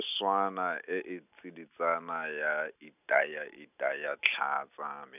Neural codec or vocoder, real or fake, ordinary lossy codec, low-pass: none; real; none; 3.6 kHz